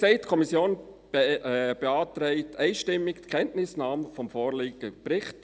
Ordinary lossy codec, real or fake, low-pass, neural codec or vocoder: none; real; none; none